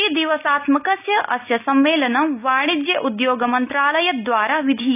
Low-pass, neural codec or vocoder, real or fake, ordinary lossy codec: 3.6 kHz; none; real; none